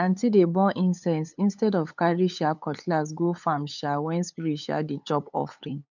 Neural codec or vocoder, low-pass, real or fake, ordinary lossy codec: codec, 16 kHz, 8 kbps, FunCodec, trained on LibriTTS, 25 frames a second; 7.2 kHz; fake; none